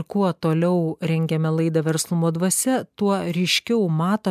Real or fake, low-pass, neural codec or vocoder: real; 14.4 kHz; none